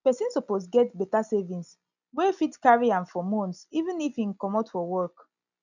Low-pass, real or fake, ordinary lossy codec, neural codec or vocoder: 7.2 kHz; real; none; none